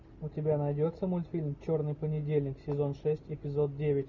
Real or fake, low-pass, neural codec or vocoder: real; 7.2 kHz; none